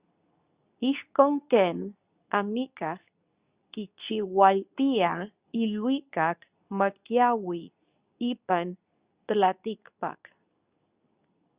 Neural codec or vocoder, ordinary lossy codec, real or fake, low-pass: codec, 24 kHz, 0.9 kbps, WavTokenizer, medium speech release version 2; Opus, 64 kbps; fake; 3.6 kHz